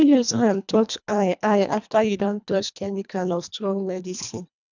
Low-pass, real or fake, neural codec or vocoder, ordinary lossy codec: 7.2 kHz; fake; codec, 24 kHz, 1.5 kbps, HILCodec; none